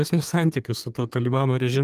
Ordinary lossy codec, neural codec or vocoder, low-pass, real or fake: Opus, 32 kbps; codec, 32 kHz, 1.9 kbps, SNAC; 14.4 kHz; fake